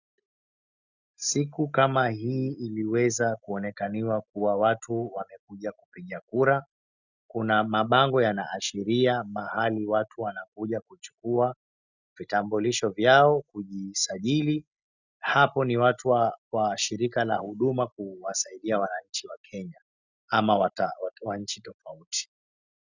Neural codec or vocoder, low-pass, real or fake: none; 7.2 kHz; real